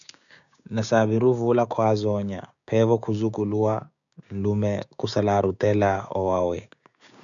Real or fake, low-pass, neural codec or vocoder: fake; 7.2 kHz; codec, 16 kHz, 6 kbps, DAC